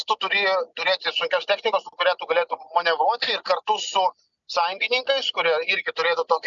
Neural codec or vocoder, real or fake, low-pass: none; real; 7.2 kHz